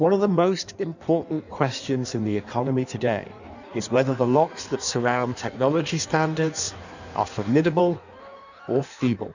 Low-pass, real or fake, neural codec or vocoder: 7.2 kHz; fake; codec, 16 kHz in and 24 kHz out, 1.1 kbps, FireRedTTS-2 codec